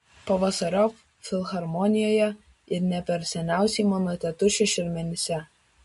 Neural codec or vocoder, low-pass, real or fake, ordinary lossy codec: none; 14.4 kHz; real; MP3, 48 kbps